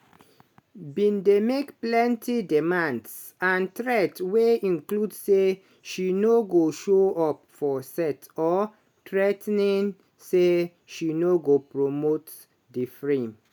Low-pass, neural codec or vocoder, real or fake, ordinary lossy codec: 19.8 kHz; none; real; none